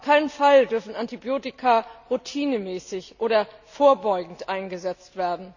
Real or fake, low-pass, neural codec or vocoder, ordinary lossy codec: real; 7.2 kHz; none; none